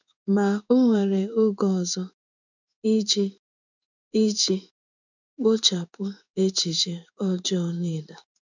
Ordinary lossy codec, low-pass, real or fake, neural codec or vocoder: none; 7.2 kHz; fake; codec, 16 kHz in and 24 kHz out, 1 kbps, XY-Tokenizer